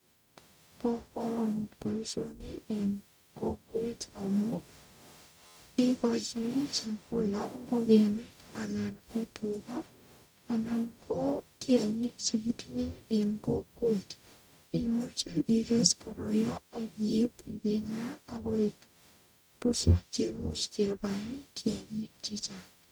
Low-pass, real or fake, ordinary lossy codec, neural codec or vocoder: none; fake; none; codec, 44.1 kHz, 0.9 kbps, DAC